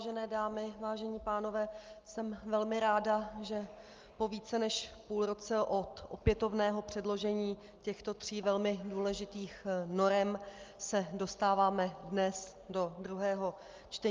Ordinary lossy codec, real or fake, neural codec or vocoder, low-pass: Opus, 24 kbps; real; none; 7.2 kHz